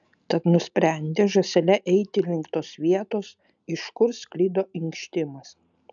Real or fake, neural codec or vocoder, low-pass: real; none; 7.2 kHz